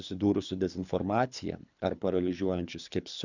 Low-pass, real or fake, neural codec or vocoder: 7.2 kHz; fake; codec, 24 kHz, 3 kbps, HILCodec